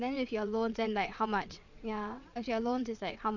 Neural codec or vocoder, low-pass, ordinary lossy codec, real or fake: vocoder, 22.05 kHz, 80 mel bands, WaveNeXt; 7.2 kHz; none; fake